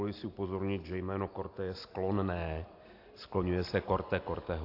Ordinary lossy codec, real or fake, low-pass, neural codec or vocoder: AAC, 32 kbps; real; 5.4 kHz; none